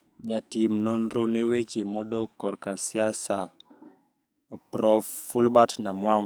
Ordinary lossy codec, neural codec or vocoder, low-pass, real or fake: none; codec, 44.1 kHz, 2.6 kbps, SNAC; none; fake